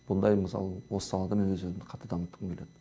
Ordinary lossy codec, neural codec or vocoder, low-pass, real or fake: none; none; none; real